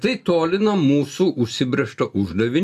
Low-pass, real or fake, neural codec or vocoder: 14.4 kHz; real; none